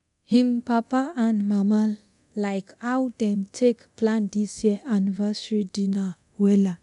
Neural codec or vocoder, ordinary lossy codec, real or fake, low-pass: codec, 24 kHz, 0.9 kbps, DualCodec; none; fake; 10.8 kHz